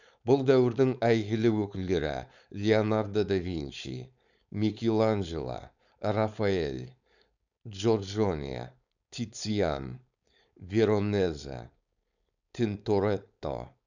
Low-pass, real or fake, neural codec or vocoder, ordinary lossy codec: 7.2 kHz; fake; codec, 16 kHz, 4.8 kbps, FACodec; none